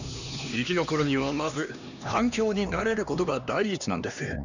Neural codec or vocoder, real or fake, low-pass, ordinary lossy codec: codec, 16 kHz, 2 kbps, X-Codec, HuBERT features, trained on LibriSpeech; fake; 7.2 kHz; none